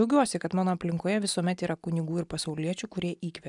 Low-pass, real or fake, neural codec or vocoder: 10.8 kHz; real; none